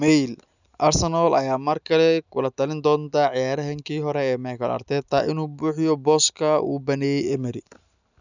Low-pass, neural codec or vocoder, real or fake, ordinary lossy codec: 7.2 kHz; none; real; none